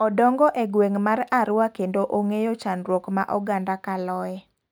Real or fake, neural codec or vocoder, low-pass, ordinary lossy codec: real; none; none; none